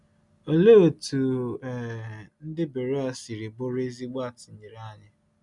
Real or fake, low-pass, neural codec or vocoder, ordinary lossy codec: real; 10.8 kHz; none; none